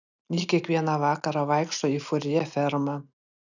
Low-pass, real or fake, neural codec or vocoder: 7.2 kHz; real; none